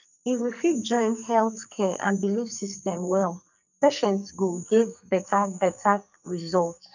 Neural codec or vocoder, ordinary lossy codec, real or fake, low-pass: codec, 44.1 kHz, 2.6 kbps, SNAC; none; fake; 7.2 kHz